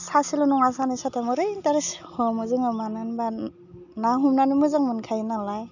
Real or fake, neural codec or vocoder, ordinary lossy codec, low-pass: real; none; none; 7.2 kHz